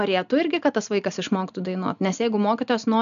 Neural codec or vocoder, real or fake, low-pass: none; real; 7.2 kHz